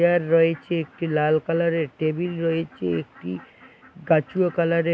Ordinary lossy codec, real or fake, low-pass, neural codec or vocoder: none; real; none; none